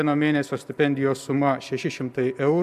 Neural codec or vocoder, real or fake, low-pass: none; real; 14.4 kHz